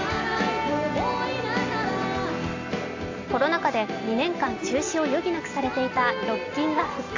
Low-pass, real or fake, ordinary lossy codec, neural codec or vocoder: 7.2 kHz; real; AAC, 48 kbps; none